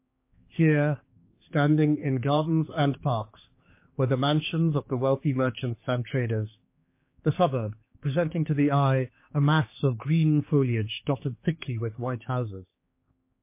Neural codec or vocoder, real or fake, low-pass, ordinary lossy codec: codec, 16 kHz, 4 kbps, X-Codec, HuBERT features, trained on general audio; fake; 3.6 kHz; MP3, 24 kbps